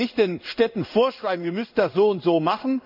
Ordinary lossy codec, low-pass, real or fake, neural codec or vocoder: none; 5.4 kHz; fake; codec, 16 kHz in and 24 kHz out, 1 kbps, XY-Tokenizer